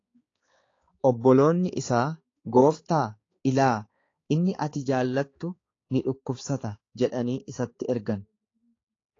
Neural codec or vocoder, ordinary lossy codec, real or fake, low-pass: codec, 16 kHz, 4 kbps, X-Codec, HuBERT features, trained on balanced general audio; AAC, 32 kbps; fake; 7.2 kHz